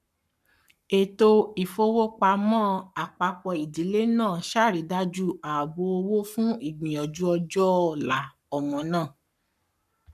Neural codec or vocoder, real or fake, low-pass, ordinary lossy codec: codec, 44.1 kHz, 7.8 kbps, Pupu-Codec; fake; 14.4 kHz; none